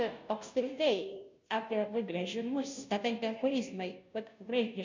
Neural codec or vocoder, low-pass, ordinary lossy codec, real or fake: codec, 16 kHz, 0.5 kbps, FunCodec, trained on Chinese and English, 25 frames a second; 7.2 kHz; MP3, 64 kbps; fake